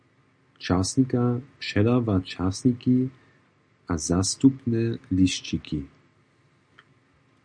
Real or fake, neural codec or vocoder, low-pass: real; none; 9.9 kHz